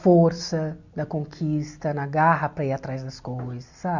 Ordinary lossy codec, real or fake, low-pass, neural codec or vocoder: none; real; 7.2 kHz; none